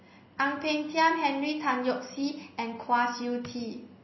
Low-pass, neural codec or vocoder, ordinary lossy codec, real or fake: 7.2 kHz; none; MP3, 24 kbps; real